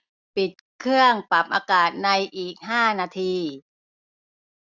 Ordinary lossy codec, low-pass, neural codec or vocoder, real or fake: none; 7.2 kHz; none; real